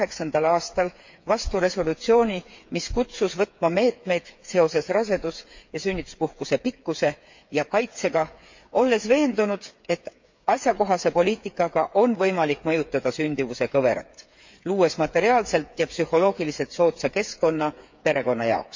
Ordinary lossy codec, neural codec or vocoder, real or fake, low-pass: MP3, 48 kbps; codec, 16 kHz, 8 kbps, FreqCodec, smaller model; fake; 7.2 kHz